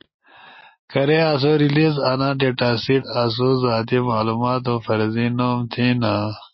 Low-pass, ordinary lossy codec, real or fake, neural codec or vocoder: 7.2 kHz; MP3, 24 kbps; real; none